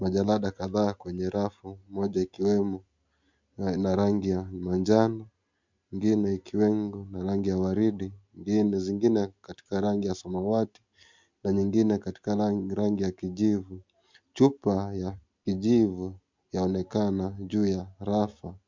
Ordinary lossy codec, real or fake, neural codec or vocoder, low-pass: MP3, 64 kbps; real; none; 7.2 kHz